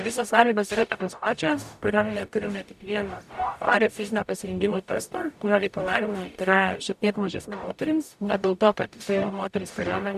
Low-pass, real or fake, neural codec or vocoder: 14.4 kHz; fake; codec, 44.1 kHz, 0.9 kbps, DAC